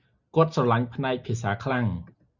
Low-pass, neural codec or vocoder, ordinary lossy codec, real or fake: 7.2 kHz; none; Opus, 64 kbps; real